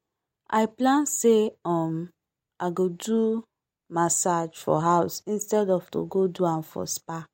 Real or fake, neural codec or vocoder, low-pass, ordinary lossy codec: real; none; 19.8 kHz; MP3, 64 kbps